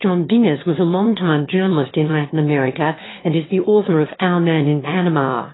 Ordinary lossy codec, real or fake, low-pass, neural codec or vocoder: AAC, 16 kbps; fake; 7.2 kHz; autoencoder, 22.05 kHz, a latent of 192 numbers a frame, VITS, trained on one speaker